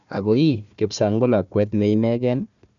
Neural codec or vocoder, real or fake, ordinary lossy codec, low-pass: codec, 16 kHz, 1 kbps, FunCodec, trained on Chinese and English, 50 frames a second; fake; none; 7.2 kHz